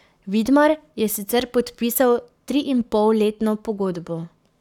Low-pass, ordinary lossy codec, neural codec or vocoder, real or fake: 19.8 kHz; none; codec, 44.1 kHz, 7.8 kbps, Pupu-Codec; fake